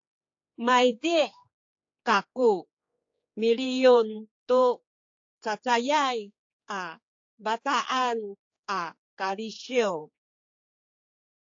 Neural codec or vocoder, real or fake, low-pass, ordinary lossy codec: codec, 16 kHz, 4 kbps, X-Codec, HuBERT features, trained on general audio; fake; 7.2 kHz; AAC, 48 kbps